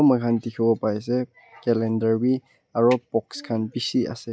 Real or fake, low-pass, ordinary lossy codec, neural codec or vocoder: real; none; none; none